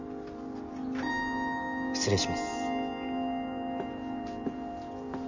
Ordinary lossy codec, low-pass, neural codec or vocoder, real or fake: none; 7.2 kHz; none; real